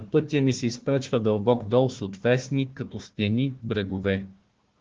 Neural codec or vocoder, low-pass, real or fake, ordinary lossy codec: codec, 16 kHz, 1 kbps, FunCodec, trained on Chinese and English, 50 frames a second; 7.2 kHz; fake; Opus, 16 kbps